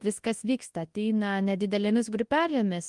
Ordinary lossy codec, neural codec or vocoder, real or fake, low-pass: Opus, 24 kbps; codec, 24 kHz, 0.9 kbps, WavTokenizer, large speech release; fake; 10.8 kHz